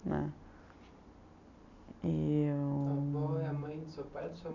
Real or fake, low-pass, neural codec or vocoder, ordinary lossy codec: real; 7.2 kHz; none; none